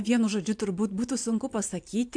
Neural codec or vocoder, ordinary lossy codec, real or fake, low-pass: none; AAC, 64 kbps; real; 9.9 kHz